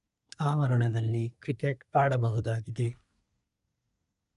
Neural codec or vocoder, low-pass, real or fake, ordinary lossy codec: codec, 24 kHz, 1 kbps, SNAC; 10.8 kHz; fake; none